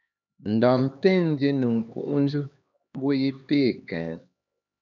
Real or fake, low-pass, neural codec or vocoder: fake; 7.2 kHz; codec, 16 kHz, 2 kbps, X-Codec, HuBERT features, trained on LibriSpeech